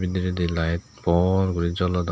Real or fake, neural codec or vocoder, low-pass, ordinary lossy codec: real; none; none; none